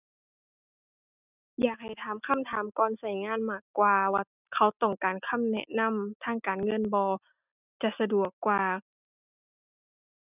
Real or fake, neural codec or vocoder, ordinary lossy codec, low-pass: real; none; none; 3.6 kHz